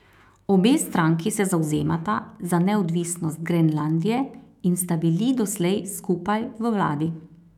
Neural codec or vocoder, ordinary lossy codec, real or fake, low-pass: autoencoder, 48 kHz, 128 numbers a frame, DAC-VAE, trained on Japanese speech; none; fake; 19.8 kHz